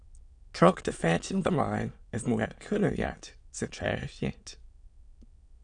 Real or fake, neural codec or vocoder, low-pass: fake; autoencoder, 22.05 kHz, a latent of 192 numbers a frame, VITS, trained on many speakers; 9.9 kHz